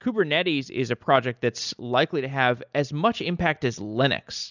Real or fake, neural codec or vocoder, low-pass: real; none; 7.2 kHz